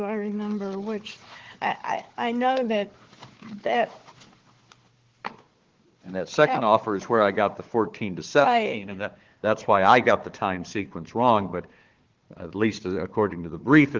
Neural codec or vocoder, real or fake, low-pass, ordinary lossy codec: codec, 16 kHz, 4 kbps, FunCodec, trained on Chinese and English, 50 frames a second; fake; 7.2 kHz; Opus, 16 kbps